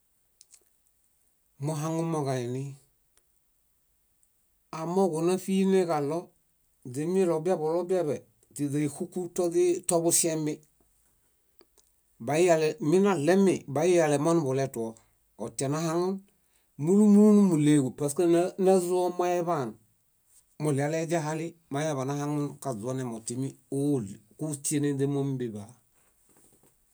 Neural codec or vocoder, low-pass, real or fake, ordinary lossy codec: none; none; real; none